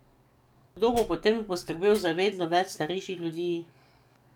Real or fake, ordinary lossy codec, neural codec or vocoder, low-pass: fake; none; codec, 44.1 kHz, 7.8 kbps, DAC; 19.8 kHz